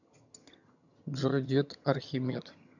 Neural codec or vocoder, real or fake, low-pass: vocoder, 22.05 kHz, 80 mel bands, HiFi-GAN; fake; 7.2 kHz